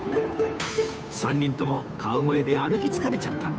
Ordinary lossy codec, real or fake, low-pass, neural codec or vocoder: none; fake; none; codec, 16 kHz, 2 kbps, FunCodec, trained on Chinese and English, 25 frames a second